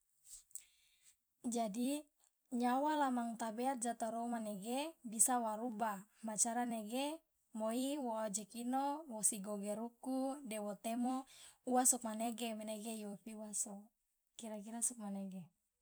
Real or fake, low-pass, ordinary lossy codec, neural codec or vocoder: fake; none; none; vocoder, 44.1 kHz, 128 mel bands every 256 samples, BigVGAN v2